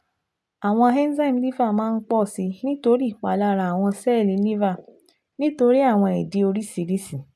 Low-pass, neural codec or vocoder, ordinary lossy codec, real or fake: none; none; none; real